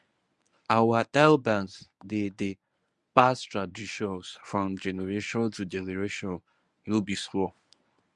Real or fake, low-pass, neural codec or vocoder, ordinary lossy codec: fake; 10.8 kHz; codec, 24 kHz, 0.9 kbps, WavTokenizer, medium speech release version 1; Opus, 64 kbps